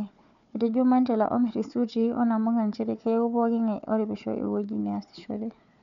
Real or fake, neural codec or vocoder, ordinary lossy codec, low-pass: fake; codec, 16 kHz, 4 kbps, FunCodec, trained on Chinese and English, 50 frames a second; none; 7.2 kHz